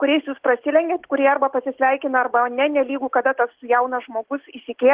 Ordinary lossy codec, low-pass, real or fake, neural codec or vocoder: Opus, 16 kbps; 3.6 kHz; real; none